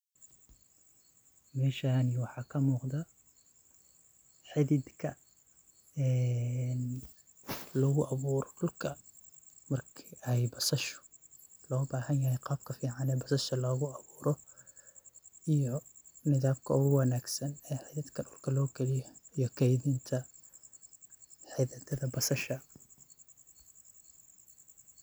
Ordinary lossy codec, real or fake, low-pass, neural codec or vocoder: none; real; none; none